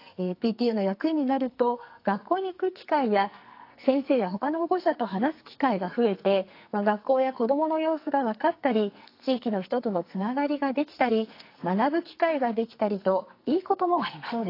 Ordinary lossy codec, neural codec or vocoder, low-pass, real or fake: AAC, 32 kbps; codec, 44.1 kHz, 2.6 kbps, SNAC; 5.4 kHz; fake